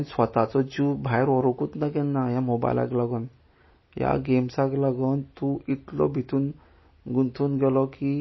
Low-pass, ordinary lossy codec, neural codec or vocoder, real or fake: 7.2 kHz; MP3, 24 kbps; none; real